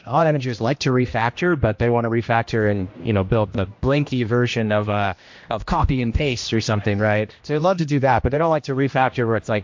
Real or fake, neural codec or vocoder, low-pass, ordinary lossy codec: fake; codec, 16 kHz, 1 kbps, X-Codec, HuBERT features, trained on general audio; 7.2 kHz; MP3, 48 kbps